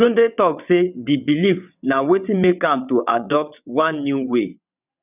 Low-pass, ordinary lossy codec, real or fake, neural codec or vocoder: 3.6 kHz; none; fake; vocoder, 22.05 kHz, 80 mel bands, WaveNeXt